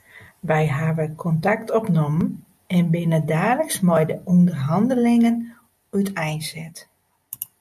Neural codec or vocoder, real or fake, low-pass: none; real; 14.4 kHz